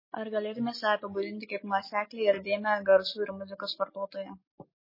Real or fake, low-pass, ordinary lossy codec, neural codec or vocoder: real; 5.4 kHz; MP3, 24 kbps; none